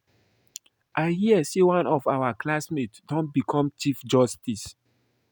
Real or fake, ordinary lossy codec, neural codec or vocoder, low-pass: real; none; none; none